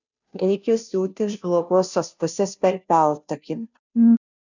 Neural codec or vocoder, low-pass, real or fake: codec, 16 kHz, 0.5 kbps, FunCodec, trained on Chinese and English, 25 frames a second; 7.2 kHz; fake